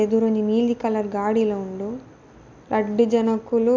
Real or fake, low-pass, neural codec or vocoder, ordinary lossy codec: real; 7.2 kHz; none; MP3, 64 kbps